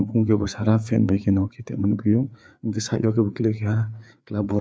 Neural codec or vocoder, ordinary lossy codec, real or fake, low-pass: codec, 16 kHz, 4 kbps, FreqCodec, larger model; none; fake; none